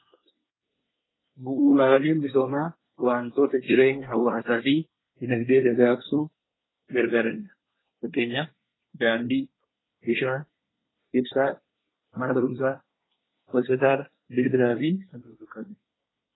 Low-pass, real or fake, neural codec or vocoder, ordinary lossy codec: 7.2 kHz; fake; codec, 24 kHz, 1 kbps, SNAC; AAC, 16 kbps